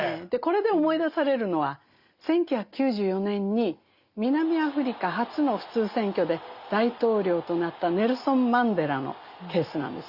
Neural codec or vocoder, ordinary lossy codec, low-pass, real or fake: none; Opus, 64 kbps; 5.4 kHz; real